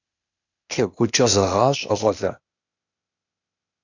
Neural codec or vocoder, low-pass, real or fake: codec, 16 kHz, 0.8 kbps, ZipCodec; 7.2 kHz; fake